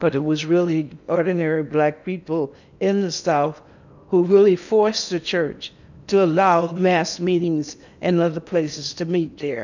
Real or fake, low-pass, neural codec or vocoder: fake; 7.2 kHz; codec, 16 kHz in and 24 kHz out, 0.8 kbps, FocalCodec, streaming, 65536 codes